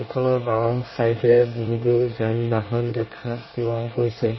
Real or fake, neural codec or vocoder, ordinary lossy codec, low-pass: fake; codec, 24 kHz, 1 kbps, SNAC; MP3, 24 kbps; 7.2 kHz